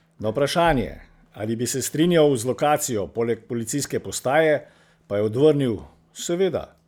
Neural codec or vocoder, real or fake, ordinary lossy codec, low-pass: none; real; none; none